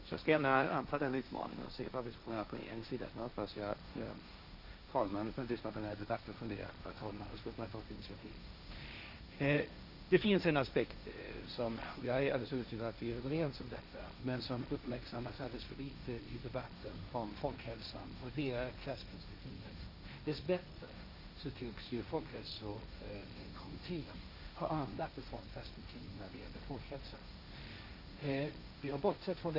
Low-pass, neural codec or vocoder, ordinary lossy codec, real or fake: 5.4 kHz; codec, 16 kHz, 1.1 kbps, Voila-Tokenizer; none; fake